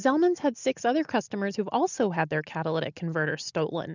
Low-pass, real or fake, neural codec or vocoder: 7.2 kHz; real; none